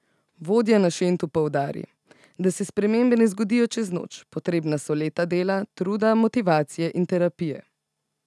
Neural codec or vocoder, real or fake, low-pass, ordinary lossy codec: none; real; none; none